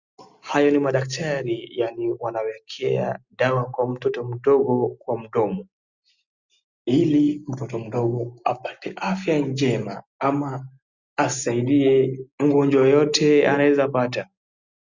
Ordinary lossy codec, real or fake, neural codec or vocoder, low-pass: Opus, 64 kbps; real; none; 7.2 kHz